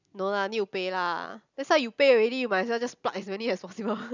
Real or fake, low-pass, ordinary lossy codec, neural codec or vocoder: real; 7.2 kHz; none; none